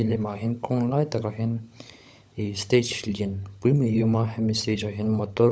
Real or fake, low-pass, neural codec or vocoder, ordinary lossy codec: fake; none; codec, 16 kHz, 16 kbps, FunCodec, trained on LibriTTS, 50 frames a second; none